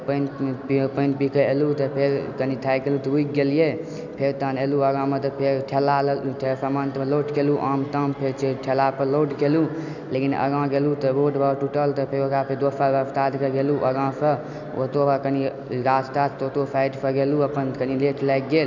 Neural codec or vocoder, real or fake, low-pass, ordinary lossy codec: none; real; 7.2 kHz; none